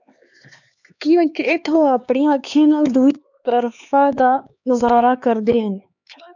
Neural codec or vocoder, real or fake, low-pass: codec, 16 kHz, 4 kbps, X-Codec, HuBERT features, trained on LibriSpeech; fake; 7.2 kHz